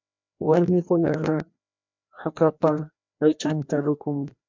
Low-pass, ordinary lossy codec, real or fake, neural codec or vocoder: 7.2 kHz; MP3, 64 kbps; fake; codec, 16 kHz, 1 kbps, FreqCodec, larger model